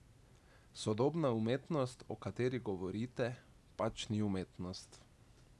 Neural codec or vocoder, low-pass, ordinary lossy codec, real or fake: none; none; none; real